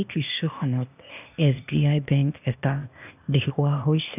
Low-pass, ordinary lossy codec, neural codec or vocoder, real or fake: 3.6 kHz; none; codec, 16 kHz, 0.8 kbps, ZipCodec; fake